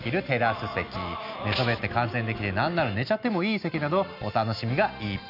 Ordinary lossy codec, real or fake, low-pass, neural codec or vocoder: none; real; 5.4 kHz; none